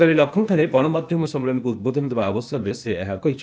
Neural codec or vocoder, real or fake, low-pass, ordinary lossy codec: codec, 16 kHz, 0.8 kbps, ZipCodec; fake; none; none